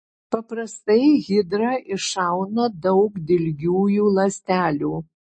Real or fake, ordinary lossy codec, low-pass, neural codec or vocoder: real; MP3, 32 kbps; 9.9 kHz; none